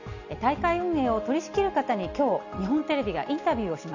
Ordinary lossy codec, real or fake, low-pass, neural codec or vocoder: none; real; 7.2 kHz; none